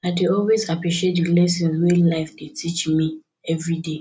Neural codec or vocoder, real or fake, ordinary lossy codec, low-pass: none; real; none; none